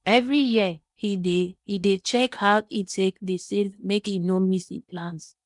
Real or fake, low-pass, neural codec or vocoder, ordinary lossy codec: fake; 10.8 kHz; codec, 16 kHz in and 24 kHz out, 0.8 kbps, FocalCodec, streaming, 65536 codes; none